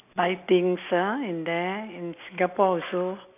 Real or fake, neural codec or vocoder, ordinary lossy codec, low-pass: real; none; none; 3.6 kHz